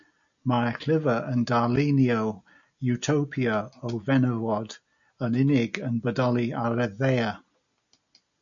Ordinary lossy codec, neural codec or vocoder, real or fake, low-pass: MP3, 96 kbps; none; real; 7.2 kHz